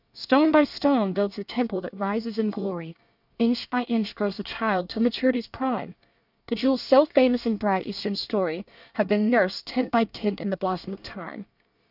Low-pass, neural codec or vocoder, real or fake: 5.4 kHz; codec, 24 kHz, 1 kbps, SNAC; fake